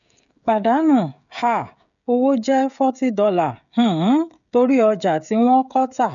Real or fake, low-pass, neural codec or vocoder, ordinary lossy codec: fake; 7.2 kHz; codec, 16 kHz, 16 kbps, FreqCodec, smaller model; none